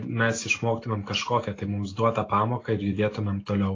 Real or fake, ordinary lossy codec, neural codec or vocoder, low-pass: real; AAC, 32 kbps; none; 7.2 kHz